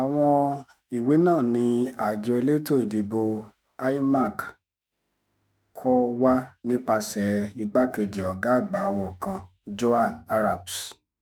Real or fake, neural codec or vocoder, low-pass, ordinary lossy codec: fake; autoencoder, 48 kHz, 32 numbers a frame, DAC-VAE, trained on Japanese speech; none; none